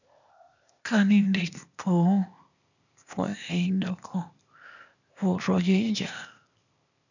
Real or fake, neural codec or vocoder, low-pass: fake; codec, 16 kHz, 0.8 kbps, ZipCodec; 7.2 kHz